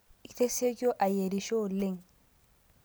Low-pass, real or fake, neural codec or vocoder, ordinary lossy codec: none; real; none; none